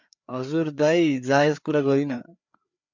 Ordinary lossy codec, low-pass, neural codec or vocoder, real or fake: MP3, 64 kbps; 7.2 kHz; codec, 16 kHz, 4 kbps, FreqCodec, larger model; fake